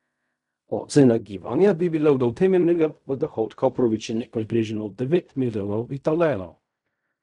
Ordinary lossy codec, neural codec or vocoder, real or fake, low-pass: none; codec, 16 kHz in and 24 kHz out, 0.4 kbps, LongCat-Audio-Codec, fine tuned four codebook decoder; fake; 10.8 kHz